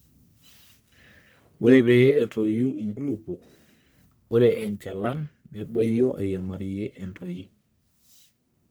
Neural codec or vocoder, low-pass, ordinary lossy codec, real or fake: codec, 44.1 kHz, 1.7 kbps, Pupu-Codec; none; none; fake